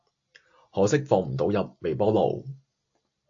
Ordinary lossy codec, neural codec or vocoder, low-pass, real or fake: AAC, 48 kbps; none; 7.2 kHz; real